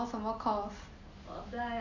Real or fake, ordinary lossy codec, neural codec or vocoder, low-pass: real; AAC, 48 kbps; none; 7.2 kHz